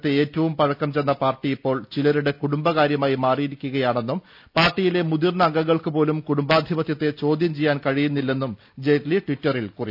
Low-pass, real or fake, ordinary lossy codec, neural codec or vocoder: 5.4 kHz; real; none; none